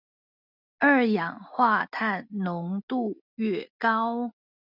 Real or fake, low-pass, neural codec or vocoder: real; 5.4 kHz; none